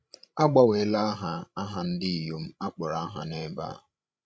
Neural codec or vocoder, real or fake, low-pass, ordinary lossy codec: codec, 16 kHz, 16 kbps, FreqCodec, larger model; fake; none; none